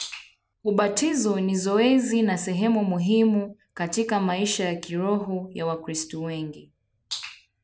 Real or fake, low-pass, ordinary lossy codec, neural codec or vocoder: real; none; none; none